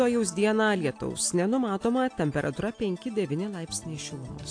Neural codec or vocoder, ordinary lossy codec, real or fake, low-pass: none; AAC, 48 kbps; real; 9.9 kHz